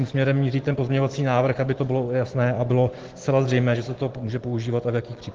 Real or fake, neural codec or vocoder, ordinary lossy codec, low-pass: real; none; Opus, 16 kbps; 7.2 kHz